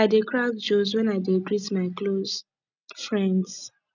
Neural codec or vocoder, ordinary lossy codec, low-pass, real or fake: none; none; 7.2 kHz; real